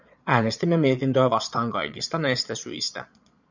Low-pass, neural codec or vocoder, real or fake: 7.2 kHz; vocoder, 22.05 kHz, 80 mel bands, Vocos; fake